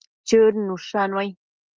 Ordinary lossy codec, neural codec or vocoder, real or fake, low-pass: Opus, 24 kbps; none; real; 7.2 kHz